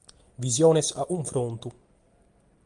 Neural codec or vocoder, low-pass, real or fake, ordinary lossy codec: none; 10.8 kHz; real; Opus, 32 kbps